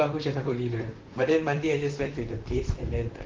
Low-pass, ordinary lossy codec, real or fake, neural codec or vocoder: 7.2 kHz; Opus, 16 kbps; fake; vocoder, 44.1 kHz, 128 mel bands, Pupu-Vocoder